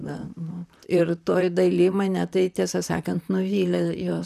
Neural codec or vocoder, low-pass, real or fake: vocoder, 44.1 kHz, 128 mel bands, Pupu-Vocoder; 14.4 kHz; fake